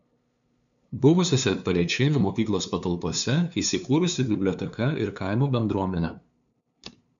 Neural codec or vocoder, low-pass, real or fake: codec, 16 kHz, 2 kbps, FunCodec, trained on LibriTTS, 25 frames a second; 7.2 kHz; fake